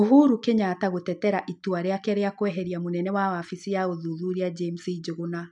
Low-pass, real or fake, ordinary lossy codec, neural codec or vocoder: 10.8 kHz; real; none; none